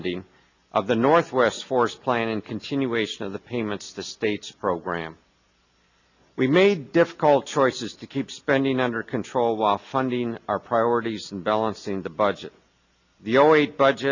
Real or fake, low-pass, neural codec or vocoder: real; 7.2 kHz; none